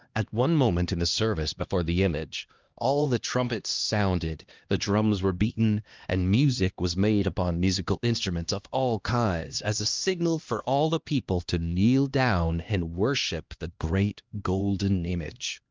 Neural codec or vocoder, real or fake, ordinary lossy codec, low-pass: codec, 16 kHz, 1 kbps, X-Codec, HuBERT features, trained on LibriSpeech; fake; Opus, 32 kbps; 7.2 kHz